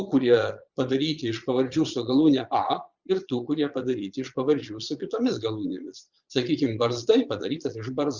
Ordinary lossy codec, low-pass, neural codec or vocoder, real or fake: Opus, 64 kbps; 7.2 kHz; vocoder, 22.05 kHz, 80 mel bands, Vocos; fake